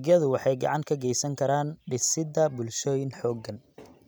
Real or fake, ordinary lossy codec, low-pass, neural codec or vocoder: real; none; none; none